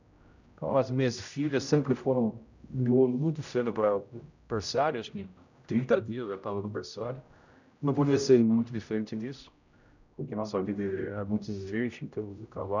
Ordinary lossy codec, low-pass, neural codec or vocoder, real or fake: none; 7.2 kHz; codec, 16 kHz, 0.5 kbps, X-Codec, HuBERT features, trained on general audio; fake